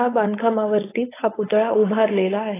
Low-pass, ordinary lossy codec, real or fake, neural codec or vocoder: 3.6 kHz; AAC, 16 kbps; fake; codec, 16 kHz, 4.8 kbps, FACodec